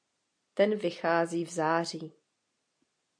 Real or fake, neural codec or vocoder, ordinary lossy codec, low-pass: real; none; AAC, 64 kbps; 9.9 kHz